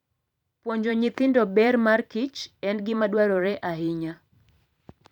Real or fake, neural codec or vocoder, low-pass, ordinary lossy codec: fake; vocoder, 44.1 kHz, 128 mel bands every 512 samples, BigVGAN v2; 19.8 kHz; none